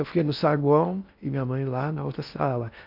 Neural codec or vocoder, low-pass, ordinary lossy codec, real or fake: codec, 16 kHz in and 24 kHz out, 0.6 kbps, FocalCodec, streaming, 4096 codes; 5.4 kHz; AAC, 48 kbps; fake